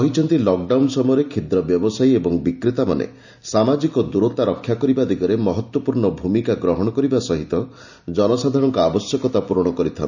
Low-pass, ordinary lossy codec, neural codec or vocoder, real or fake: 7.2 kHz; none; none; real